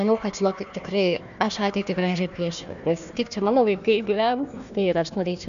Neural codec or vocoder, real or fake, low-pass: codec, 16 kHz, 1 kbps, FunCodec, trained on Chinese and English, 50 frames a second; fake; 7.2 kHz